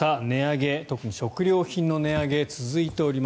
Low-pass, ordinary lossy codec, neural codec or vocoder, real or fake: none; none; none; real